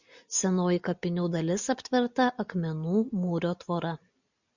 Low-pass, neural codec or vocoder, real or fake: 7.2 kHz; none; real